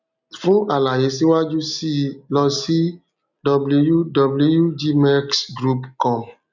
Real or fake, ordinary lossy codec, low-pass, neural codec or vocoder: real; none; 7.2 kHz; none